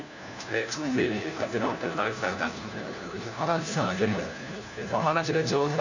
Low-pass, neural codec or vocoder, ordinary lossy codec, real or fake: 7.2 kHz; codec, 16 kHz, 1 kbps, FunCodec, trained on LibriTTS, 50 frames a second; none; fake